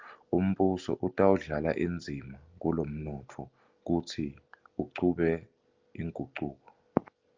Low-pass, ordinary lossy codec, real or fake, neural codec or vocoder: 7.2 kHz; Opus, 24 kbps; real; none